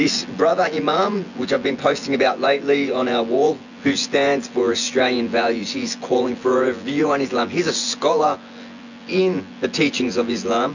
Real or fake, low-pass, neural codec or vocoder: fake; 7.2 kHz; vocoder, 24 kHz, 100 mel bands, Vocos